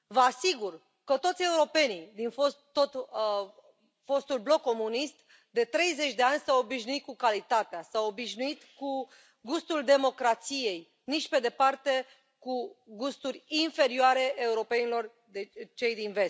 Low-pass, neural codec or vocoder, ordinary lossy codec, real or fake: none; none; none; real